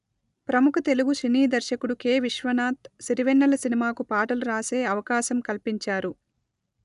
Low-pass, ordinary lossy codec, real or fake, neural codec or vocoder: 10.8 kHz; none; real; none